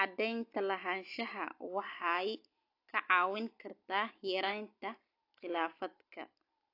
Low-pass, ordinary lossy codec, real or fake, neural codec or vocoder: 5.4 kHz; AAC, 32 kbps; real; none